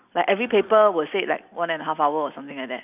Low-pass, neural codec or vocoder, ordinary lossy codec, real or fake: 3.6 kHz; none; none; real